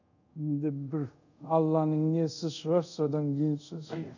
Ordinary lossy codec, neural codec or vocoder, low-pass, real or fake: none; codec, 24 kHz, 0.5 kbps, DualCodec; 7.2 kHz; fake